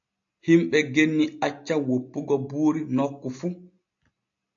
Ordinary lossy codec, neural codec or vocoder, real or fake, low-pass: AAC, 64 kbps; none; real; 7.2 kHz